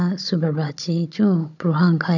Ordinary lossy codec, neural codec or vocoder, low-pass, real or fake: none; codec, 16 kHz, 16 kbps, FunCodec, trained on Chinese and English, 50 frames a second; 7.2 kHz; fake